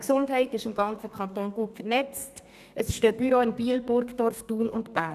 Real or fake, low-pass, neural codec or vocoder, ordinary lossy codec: fake; 14.4 kHz; codec, 32 kHz, 1.9 kbps, SNAC; none